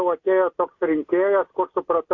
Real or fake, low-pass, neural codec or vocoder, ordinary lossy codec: real; 7.2 kHz; none; AAC, 48 kbps